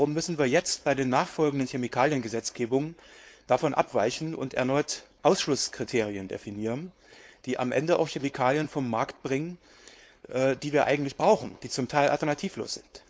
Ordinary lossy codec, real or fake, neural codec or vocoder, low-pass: none; fake; codec, 16 kHz, 4.8 kbps, FACodec; none